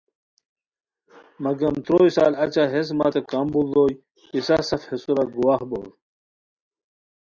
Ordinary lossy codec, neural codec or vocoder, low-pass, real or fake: Opus, 64 kbps; none; 7.2 kHz; real